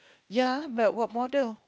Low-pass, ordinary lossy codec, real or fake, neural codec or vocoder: none; none; fake; codec, 16 kHz, 0.8 kbps, ZipCodec